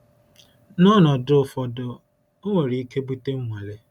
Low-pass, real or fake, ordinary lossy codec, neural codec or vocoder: 19.8 kHz; real; none; none